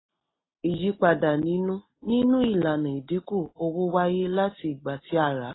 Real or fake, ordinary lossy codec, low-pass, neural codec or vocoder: real; AAC, 16 kbps; 7.2 kHz; none